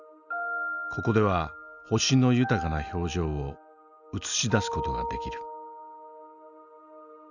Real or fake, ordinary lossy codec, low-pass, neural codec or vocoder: real; none; 7.2 kHz; none